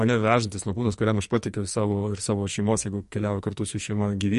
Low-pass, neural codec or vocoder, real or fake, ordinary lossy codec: 14.4 kHz; codec, 44.1 kHz, 2.6 kbps, SNAC; fake; MP3, 48 kbps